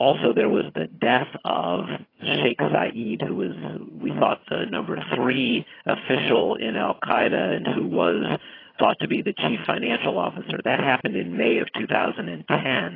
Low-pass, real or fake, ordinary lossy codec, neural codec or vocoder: 5.4 kHz; fake; AAC, 24 kbps; vocoder, 22.05 kHz, 80 mel bands, HiFi-GAN